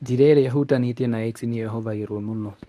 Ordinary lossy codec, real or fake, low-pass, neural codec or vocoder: none; fake; none; codec, 24 kHz, 0.9 kbps, WavTokenizer, medium speech release version 1